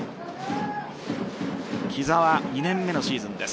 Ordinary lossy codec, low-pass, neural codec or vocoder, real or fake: none; none; none; real